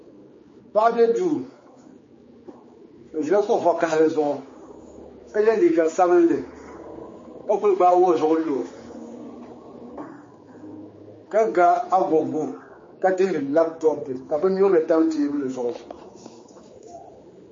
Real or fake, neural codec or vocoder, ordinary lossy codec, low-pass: fake; codec, 16 kHz, 4 kbps, X-Codec, HuBERT features, trained on general audio; MP3, 32 kbps; 7.2 kHz